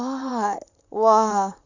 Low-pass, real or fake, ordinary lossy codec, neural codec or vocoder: 7.2 kHz; fake; none; vocoder, 44.1 kHz, 128 mel bands, Pupu-Vocoder